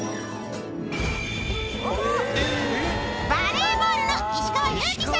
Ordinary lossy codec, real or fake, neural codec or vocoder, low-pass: none; real; none; none